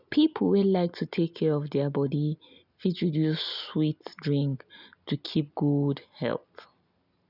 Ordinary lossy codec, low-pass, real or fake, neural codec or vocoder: none; 5.4 kHz; real; none